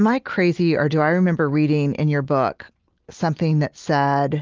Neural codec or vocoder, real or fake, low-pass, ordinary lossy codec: none; real; 7.2 kHz; Opus, 32 kbps